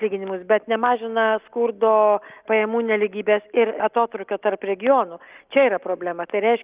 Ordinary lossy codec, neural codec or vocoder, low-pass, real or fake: Opus, 24 kbps; none; 3.6 kHz; real